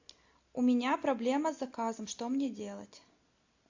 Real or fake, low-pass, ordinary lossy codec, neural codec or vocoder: real; 7.2 kHz; AAC, 48 kbps; none